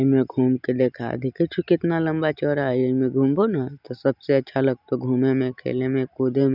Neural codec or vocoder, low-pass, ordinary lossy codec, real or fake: none; 5.4 kHz; none; real